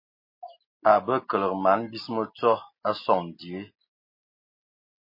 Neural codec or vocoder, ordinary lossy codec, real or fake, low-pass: none; MP3, 24 kbps; real; 5.4 kHz